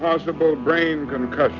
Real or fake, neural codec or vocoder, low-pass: real; none; 7.2 kHz